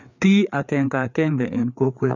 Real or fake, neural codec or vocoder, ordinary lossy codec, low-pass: fake; codec, 16 kHz, 4 kbps, FreqCodec, larger model; none; 7.2 kHz